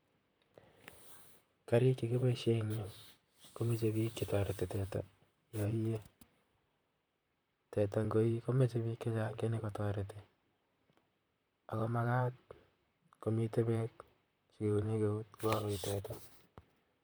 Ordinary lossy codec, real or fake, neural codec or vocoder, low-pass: none; fake; vocoder, 44.1 kHz, 128 mel bands, Pupu-Vocoder; none